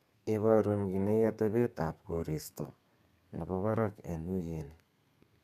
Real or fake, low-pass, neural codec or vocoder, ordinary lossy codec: fake; 14.4 kHz; codec, 32 kHz, 1.9 kbps, SNAC; none